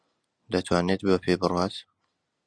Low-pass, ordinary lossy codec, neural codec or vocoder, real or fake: 9.9 kHz; Opus, 64 kbps; none; real